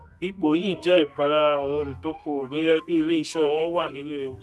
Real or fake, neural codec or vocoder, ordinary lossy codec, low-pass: fake; codec, 24 kHz, 0.9 kbps, WavTokenizer, medium music audio release; none; none